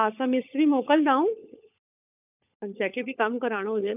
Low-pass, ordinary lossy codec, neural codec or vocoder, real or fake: 3.6 kHz; none; codec, 16 kHz, 16 kbps, FunCodec, trained on LibriTTS, 50 frames a second; fake